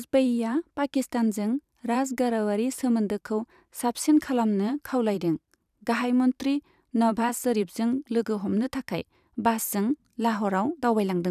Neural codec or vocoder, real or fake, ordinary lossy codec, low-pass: vocoder, 44.1 kHz, 128 mel bands every 512 samples, BigVGAN v2; fake; none; 14.4 kHz